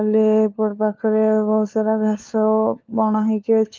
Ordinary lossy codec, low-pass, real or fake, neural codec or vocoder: Opus, 32 kbps; 7.2 kHz; fake; codec, 16 kHz, 4 kbps, FunCodec, trained on LibriTTS, 50 frames a second